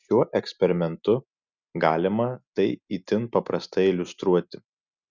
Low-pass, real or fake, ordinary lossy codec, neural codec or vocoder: 7.2 kHz; real; Opus, 64 kbps; none